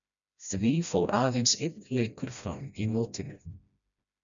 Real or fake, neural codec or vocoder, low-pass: fake; codec, 16 kHz, 1 kbps, FreqCodec, smaller model; 7.2 kHz